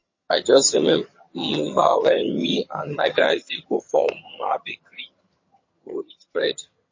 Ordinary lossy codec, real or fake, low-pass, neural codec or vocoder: MP3, 32 kbps; fake; 7.2 kHz; vocoder, 22.05 kHz, 80 mel bands, HiFi-GAN